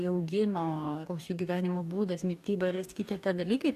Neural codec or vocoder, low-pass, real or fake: codec, 44.1 kHz, 2.6 kbps, DAC; 14.4 kHz; fake